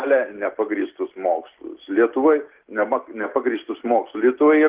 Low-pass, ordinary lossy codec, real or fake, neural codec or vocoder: 3.6 kHz; Opus, 16 kbps; real; none